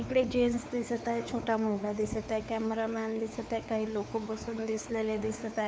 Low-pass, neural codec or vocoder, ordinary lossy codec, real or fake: none; codec, 16 kHz, 4 kbps, X-Codec, WavLM features, trained on Multilingual LibriSpeech; none; fake